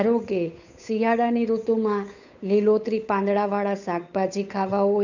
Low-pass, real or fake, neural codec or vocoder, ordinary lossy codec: 7.2 kHz; fake; codec, 16 kHz, 8 kbps, FunCodec, trained on Chinese and English, 25 frames a second; none